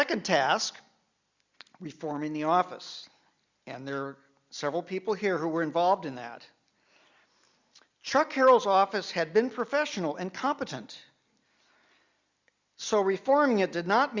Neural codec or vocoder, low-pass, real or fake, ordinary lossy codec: none; 7.2 kHz; real; Opus, 64 kbps